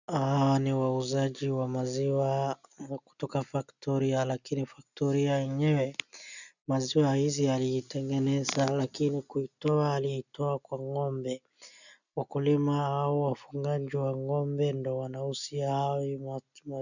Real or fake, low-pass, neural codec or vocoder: real; 7.2 kHz; none